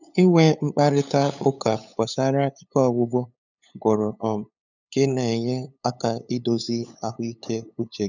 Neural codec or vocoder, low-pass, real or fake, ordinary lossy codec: codec, 16 kHz, 8 kbps, FunCodec, trained on LibriTTS, 25 frames a second; 7.2 kHz; fake; none